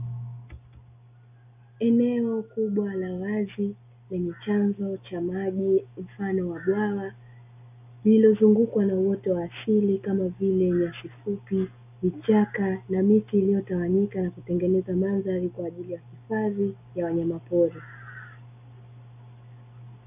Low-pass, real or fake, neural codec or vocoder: 3.6 kHz; real; none